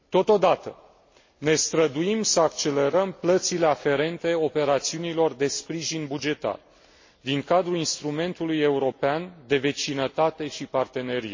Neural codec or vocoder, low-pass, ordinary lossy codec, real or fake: none; 7.2 kHz; MP3, 32 kbps; real